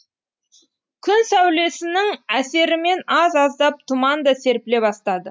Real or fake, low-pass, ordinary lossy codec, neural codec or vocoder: real; none; none; none